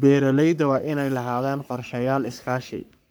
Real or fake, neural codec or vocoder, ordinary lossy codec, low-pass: fake; codec, 44.1 kHz, 3.4 kbps, Pupu-Codec; none; none